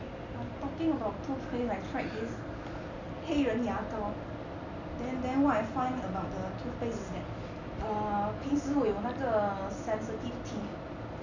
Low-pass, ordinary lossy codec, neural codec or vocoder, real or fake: 7.2 kHz; none; none; real